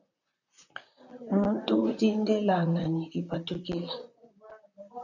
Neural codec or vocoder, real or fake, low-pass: vocoder, 44.1 kHz, 128 mel bands, Pupu-Vocoder; fake; 7.2 kHz